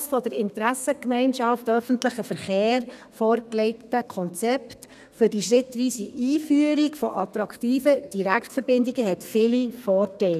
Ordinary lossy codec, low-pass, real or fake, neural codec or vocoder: none; 14.4 kHz; fake; codec, 32 kHz, 1.9 kbps, SNAC